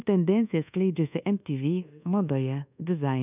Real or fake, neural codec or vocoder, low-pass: fake; codec, 24 kHz, 1.2 kbps, DualCodec; 3.6 kHz